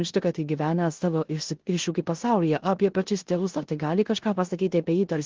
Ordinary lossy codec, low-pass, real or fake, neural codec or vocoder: Opus, 16 kbps; 7.2 kHz; fake; codec, 16 kHz in and 24 kHz out, 0.9 kbps, LongCat-Audio-Codec, four codebook decoder